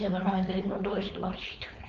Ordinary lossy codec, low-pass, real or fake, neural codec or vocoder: Opus, 32 kbps; 7.2 kHz; fake; codec, 16 kHz, 4.8 kbps, FACodec